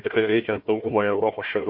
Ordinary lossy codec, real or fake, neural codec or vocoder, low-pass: MP3, 32 kbps; fake; codec, 16 kHz, 1 kbps, FunCodec, trained on Chinese and English, 50 frames a second; 5.4 kHz